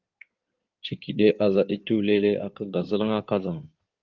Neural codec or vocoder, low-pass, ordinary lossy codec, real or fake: codec, 16 kHz in and 24 kHz out, 2.2 kbps, FireRedTTS-2 codec; 7.2 kHz; Opus, 24 kbps; fake